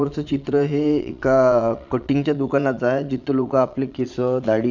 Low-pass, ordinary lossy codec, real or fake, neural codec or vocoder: 7.2 kHz; none; fake; vocoder, 22.05 kHz, 80 mel bands, Vocos